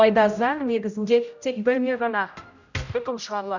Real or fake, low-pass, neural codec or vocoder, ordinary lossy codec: fake; 7.2 kHz; codec, 16 kHz, 0.5 kbps, X-Codec, HuBERT features, trained on general audio; none